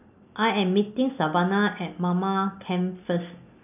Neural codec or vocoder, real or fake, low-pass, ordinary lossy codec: none; real; 3.6 kHz; none